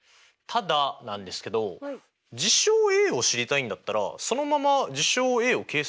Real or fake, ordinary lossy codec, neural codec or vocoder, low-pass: real; none; none; none